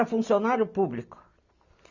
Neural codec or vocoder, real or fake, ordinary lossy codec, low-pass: none; real; none; 7.2 kHz